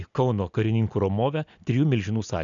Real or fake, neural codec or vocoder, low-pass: real; none; 7.2 kHz